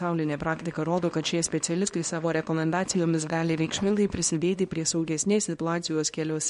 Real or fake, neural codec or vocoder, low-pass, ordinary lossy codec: fake; codec, 24 kHz, 0.9 kbps, WavTokenizer, small release; 10.8 kHz; MP3, 48 kbps